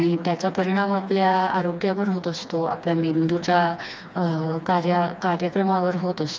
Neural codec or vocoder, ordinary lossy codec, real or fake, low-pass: codec, 16 kHz, 2 kbps, FreqCodec, smaller model; none; fake; none